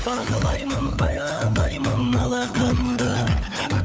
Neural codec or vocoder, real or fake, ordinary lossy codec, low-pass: codec, 16 kHz, 4 kbps, FunCodec, trained on LibriTTS, 50 frames a second; fake; none; none